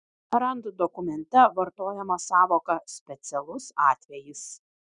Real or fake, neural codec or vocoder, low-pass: real; none; 9.9 kHz